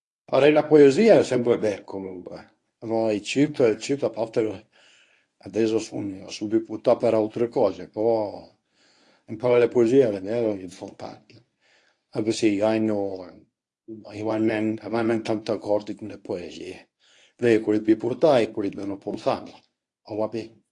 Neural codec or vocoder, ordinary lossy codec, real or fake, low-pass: codec, 24 kHz, 0.9 kbps, WavTokenizer, medium speech release version 1; AAC, 48 kbps; fake; 10.8 kHz